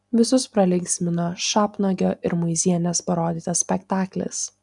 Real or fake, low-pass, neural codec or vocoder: real; 10.8 kHz; none